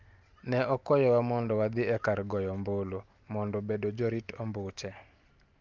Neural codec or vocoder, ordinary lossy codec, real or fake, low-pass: none; Opus, 32 kbps; real; 7.2 kHz